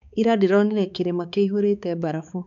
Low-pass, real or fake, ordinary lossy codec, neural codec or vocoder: 7.2 kHz; fake; none; codec, 16 kHz, 4 kbps, X-Codec, HuBERT features, trained on balanced general audio